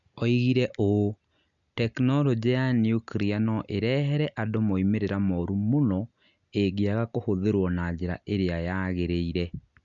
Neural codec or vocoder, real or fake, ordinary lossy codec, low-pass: none; real; none; 7.2 kHz